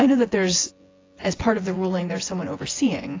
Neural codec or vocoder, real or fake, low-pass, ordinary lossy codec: vocoder, 24 kHz, 100 mel bands, Vocos; fake; 7.2 kHz; AAC, 32 kbps